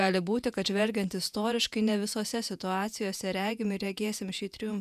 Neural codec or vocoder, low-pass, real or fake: vocoder, 48 kHz, 128 mel bands, Vocos; 14.4 kHz; fake